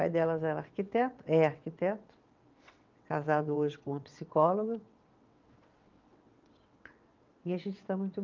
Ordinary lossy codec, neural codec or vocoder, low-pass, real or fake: Opus, 24 kbps; vocoder, 22.05 kHz, 80 mel bands, WaveNeXt; 7.2 kHz; fake